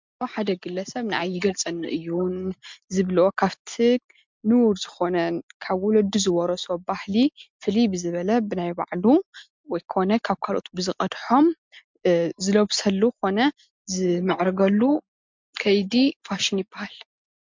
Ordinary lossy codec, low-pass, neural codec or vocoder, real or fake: MP3, 64 kbps; 7.2 kHz; none; real